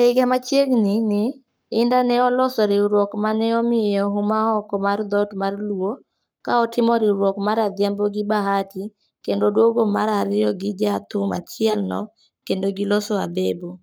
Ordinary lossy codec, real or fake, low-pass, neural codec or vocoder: none; fake; none; codec, 44.1 kHz, 7.8 kbps, Pupu-Codec